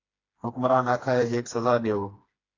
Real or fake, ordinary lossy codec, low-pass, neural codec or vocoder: fake; AAC, 32 kbps; 7.2 kHz; codec, 16 kHz, 2 kbps, FreqCodec, smaller model